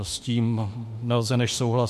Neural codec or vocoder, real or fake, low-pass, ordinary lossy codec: autoencoder, 48 kHz, 32 numbers a frame, DAC-VAE, trained on Japanese speech; fake; 14.4 kHz; MP3, 64 kbps